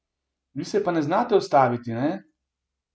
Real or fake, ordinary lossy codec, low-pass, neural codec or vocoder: real; none; none; none